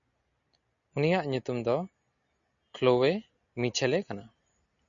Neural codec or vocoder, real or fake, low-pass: none; real; 7.2 kHz